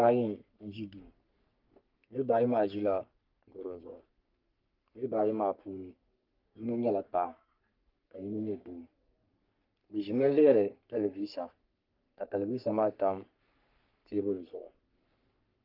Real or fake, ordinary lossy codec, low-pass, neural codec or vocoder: fake; Opus, 32 kbps; 5.4 kHz; codec, 44.1 kHz, 3.4 kbps, Pupu-Codec